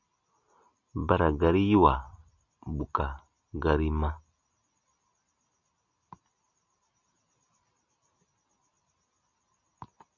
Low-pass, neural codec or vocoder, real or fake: 7.2 kHz; none; real